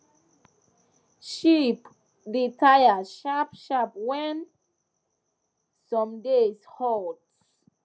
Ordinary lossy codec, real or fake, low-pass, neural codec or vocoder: none; real; none; none